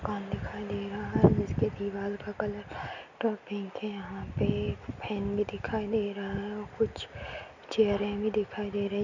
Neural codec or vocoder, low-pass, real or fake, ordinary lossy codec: none; 7.2 kHz; real; none